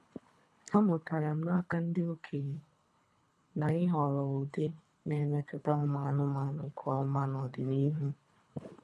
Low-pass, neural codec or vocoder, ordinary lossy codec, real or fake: none; codec, 24 kHz, 3 kbps, HILCodec; none; fake